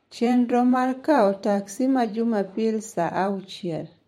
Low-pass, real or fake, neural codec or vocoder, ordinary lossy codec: 19.8 kHz; fake; vocoder, 44.1 kHz, 128 mel bands every 512 samples, BigVGAN v2; MP3, 64 kbps